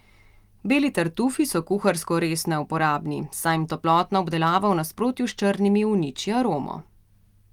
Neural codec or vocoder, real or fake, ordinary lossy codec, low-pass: none; real; Opus, 32 kbps; 19.8 kHz